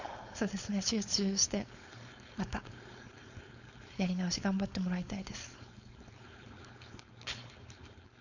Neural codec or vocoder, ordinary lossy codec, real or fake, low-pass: codec, 16 kHz, 4.8 kbps, FACodec; none; fake; 7.2 kHz